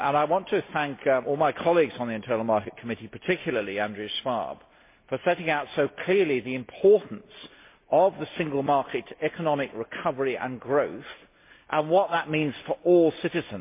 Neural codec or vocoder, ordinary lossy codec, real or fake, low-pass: none; MP3, 24 kbps; real; 3.6 kHz